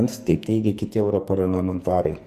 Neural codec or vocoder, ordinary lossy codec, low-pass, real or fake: codec, 44.1 kHz, 2.6 kbps, SNAC; Opus, 64 kbps; 14.4 kHz; fake